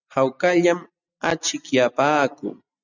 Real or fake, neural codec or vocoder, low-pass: real; none; 7.2 kHz